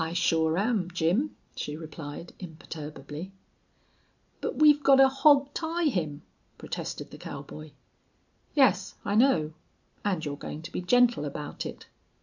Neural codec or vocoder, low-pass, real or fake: none; 7.2 kHz; real